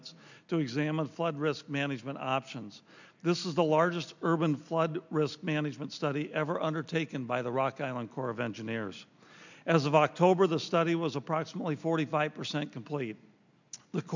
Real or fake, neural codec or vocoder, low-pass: real; none; 7.2 kHz